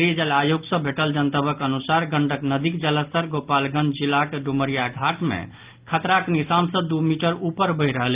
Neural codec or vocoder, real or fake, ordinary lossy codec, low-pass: none; real; Opus, 32 kbps; 3.6 kHz